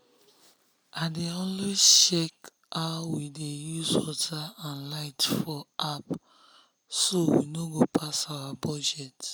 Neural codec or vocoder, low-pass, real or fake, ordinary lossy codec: none; none; real; none